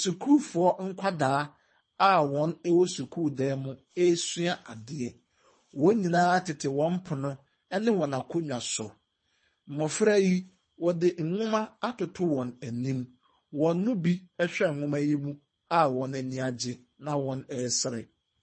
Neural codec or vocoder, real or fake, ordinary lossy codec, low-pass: codec, 24 kHz, 3 kbps, HILCodec; fake; MP3, 32 kbps; 9.9 kHz